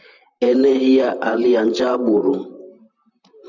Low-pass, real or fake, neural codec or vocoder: 7.2 kHz; fake; vocoder, 44.1 kHz, 128 mel bands, Pupu-Vocoder